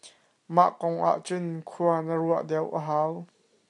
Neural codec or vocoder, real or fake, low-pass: none; real; 10.8 kHz